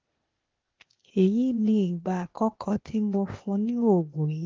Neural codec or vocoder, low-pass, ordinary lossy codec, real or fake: codec, 16 kHz, 0.8 kbps, ZipCodec; 7.2 kHz; Opus, 16 kbps; fake